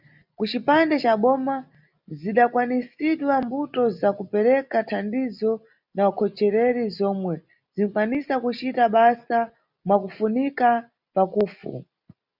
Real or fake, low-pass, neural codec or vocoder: real; 5.4 kHz; none